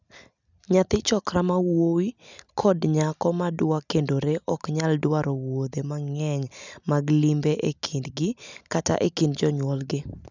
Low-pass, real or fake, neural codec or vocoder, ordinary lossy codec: 7.2 kHz; real; none; none